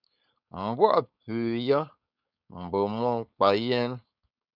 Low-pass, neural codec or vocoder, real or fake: 5.4 kHz; codec, 16 kHz, 4.8 kbps, FACodec; fake